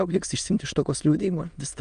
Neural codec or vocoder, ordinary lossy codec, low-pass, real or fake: autoencoder, 22.05 kHz, a latent of 192 numbers a frame, VITS, trained on many speakers; Opus, 32 kbps; 9.9 kHz; fake